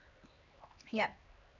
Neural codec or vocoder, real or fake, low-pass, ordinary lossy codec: codec, 16 kHz, 2 kbps, X-Codec, HuBERT features, trained on LibriSpeech; fake; 7.2 kHz; Opus, 64 kbps